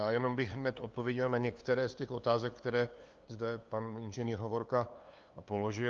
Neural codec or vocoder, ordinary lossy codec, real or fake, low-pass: codec, 16 kHz, 2 kbps, FunCodec, trained on LibriTTS, 25 frames a second; Opus, 32 kbps; fake; 7.2 kHz